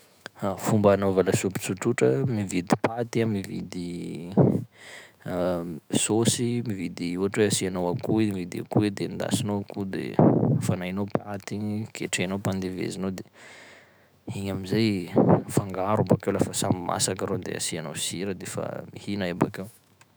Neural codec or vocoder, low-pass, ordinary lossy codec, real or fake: autoencoder, 48 kHz, 128 numbers a frame, DAC-VAE, trained on Japanese speech; none; none; fake